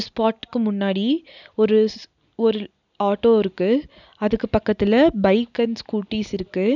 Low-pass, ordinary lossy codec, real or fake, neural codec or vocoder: 7.2 kHz; none; real; none